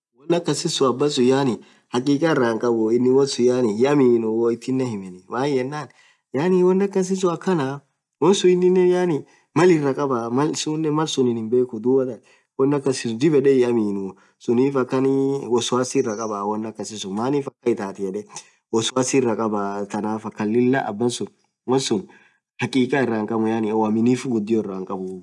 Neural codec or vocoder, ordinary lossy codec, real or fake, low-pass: none; none; real; none